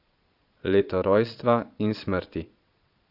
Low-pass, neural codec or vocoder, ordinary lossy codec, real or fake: 5.4 kHz; vocoder, 22.05 kHz, 80 mel bands, Vocos; none; fake